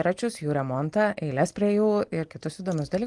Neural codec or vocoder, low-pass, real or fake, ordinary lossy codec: none; 10.8 kHz; real; Opus, 24 kbps